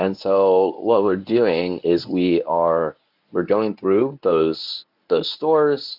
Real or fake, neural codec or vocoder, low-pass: fake; codec, 24 kHz, 0.9 kbps, WavTokenizer, medium speech release version 1; 5.4 kHz